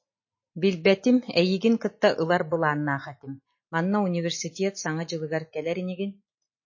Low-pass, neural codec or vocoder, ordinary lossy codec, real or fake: 7.2 kHz; none; MP3, 32 kbps; real